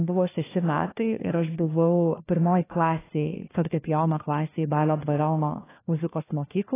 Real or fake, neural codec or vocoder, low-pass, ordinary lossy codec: fake; codec, 16 kHz, 1 kbps, FunCodec, trained on LibriTTS, 50 frames a second; 3.6 kHz; AAC, 16 kbps